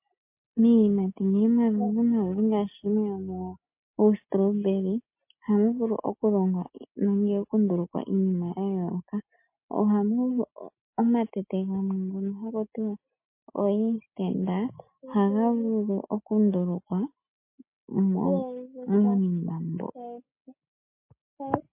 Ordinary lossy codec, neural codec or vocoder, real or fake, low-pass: MP3, 24 kbps; none; real; 3.6 kHz